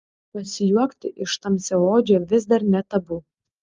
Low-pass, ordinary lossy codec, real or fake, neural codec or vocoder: 7.2 kHz; Opus, 32 kbps; real; none